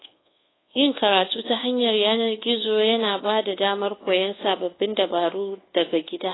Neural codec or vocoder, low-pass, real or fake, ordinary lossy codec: codec, 24 kHz, 1.2 kbps, DualCodec; 7.2 kHz; fake; AAC, 16 kbps